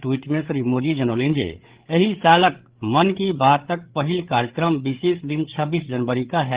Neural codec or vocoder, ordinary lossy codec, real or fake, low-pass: codec, 16 kHz, 8 kbps, FreqCodec, larger model; Opus, 16 kbps; fake; 3.6 kHz